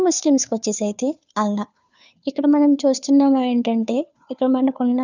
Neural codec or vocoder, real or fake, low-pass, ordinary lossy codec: codec, 16 kHz, 2 kbps, FunCodec, trained on Chinese and English, 25 frames a second; fake; 7.2 kHz; none